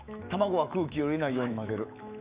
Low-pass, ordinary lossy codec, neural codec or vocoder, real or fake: 3.6 kHz; Opus, 64 kbps; autoencoder, 48 kHz, 128 numbers a frame, DAC-VAE, trained on Japanese speech; fake